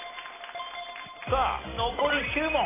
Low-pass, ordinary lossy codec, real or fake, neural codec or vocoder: 3.6 kHz; AAC, 16 kbps; real; none